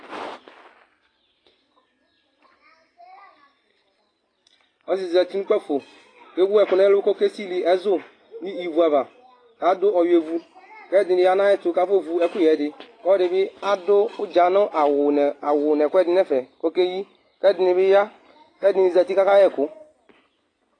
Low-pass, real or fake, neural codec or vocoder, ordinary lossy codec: 9.9 kHz; real; none; AAC, 32 kbps